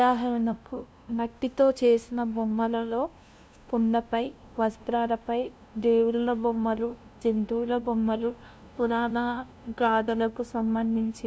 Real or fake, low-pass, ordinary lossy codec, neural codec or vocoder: fake; none; none; codec, 16 kHz, 0.5 kbps, FunCodec, trained on LibriTTS, 25 frames a second